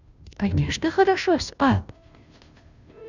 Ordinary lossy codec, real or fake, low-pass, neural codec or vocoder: none; fake; 7.2 kHz; codec, 16 kHz, 0.5 kbps, FunCodec, trained on Chinese and English, 25 frames a second